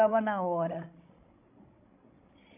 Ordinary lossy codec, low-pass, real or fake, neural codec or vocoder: none; 3.6 kHz; fake; codec, 16 kHz, 16 kbps, FreqCodec, larger model